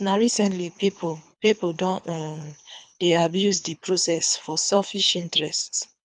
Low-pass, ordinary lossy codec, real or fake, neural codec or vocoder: 9.9 kHz; none; fake; codec, 24 kHz, 3 kbps, HILCodec